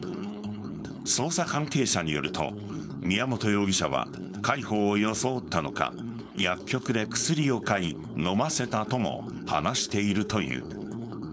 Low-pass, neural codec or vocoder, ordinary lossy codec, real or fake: none; codec, 16 kHz, 4.8 kbps, FACodec; none; fake